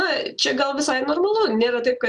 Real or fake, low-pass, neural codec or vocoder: real; 10.8 kHz; none